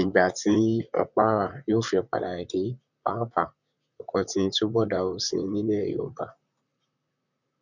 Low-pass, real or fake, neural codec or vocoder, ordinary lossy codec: 7.2 kHz; fake; vocoder, 44.1 kHz, 128 mel bands, Pupu-Vocoder; none